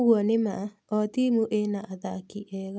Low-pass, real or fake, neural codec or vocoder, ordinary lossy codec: none; real; none; none